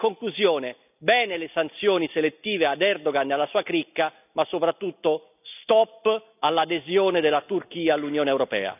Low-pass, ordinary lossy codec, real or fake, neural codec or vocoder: 3.6 kHz; none; real; none